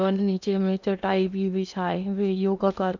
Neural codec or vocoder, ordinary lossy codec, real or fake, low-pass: codec, 16 kHz in and 24 kHz out, 0.8 kbps, FocalCodec, streaming, 65536 codes; none; fake; 7.2 kHz